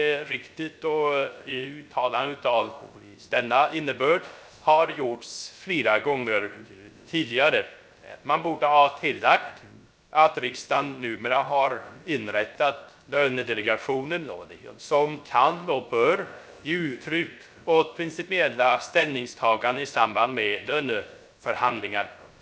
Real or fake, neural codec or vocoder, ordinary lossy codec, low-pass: fake; codec, 16 kHz, 0.3 kbps, FocalCodec; none; none